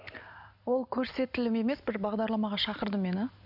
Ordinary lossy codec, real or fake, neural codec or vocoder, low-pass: none; real; none; 5.4 kHz